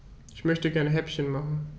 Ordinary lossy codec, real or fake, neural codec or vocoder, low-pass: none; real; none; none